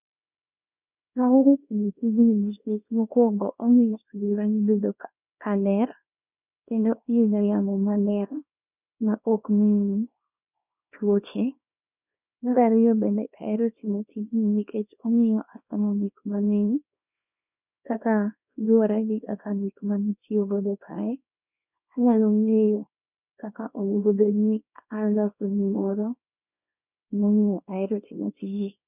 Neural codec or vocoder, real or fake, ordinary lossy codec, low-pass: codec, 24 kHz, 0.9 kbps, WavTokenizer, small release; fake; none; 3.6 kHz